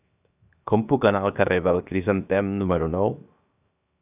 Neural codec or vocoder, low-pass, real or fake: codec, 16 kHz, 0.7 kbps, FocalCodec; 3.6 kHz; fake